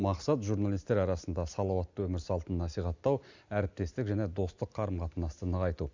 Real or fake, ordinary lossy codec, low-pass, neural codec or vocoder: real; Opus, 64 kbps; 7.2 kHz; none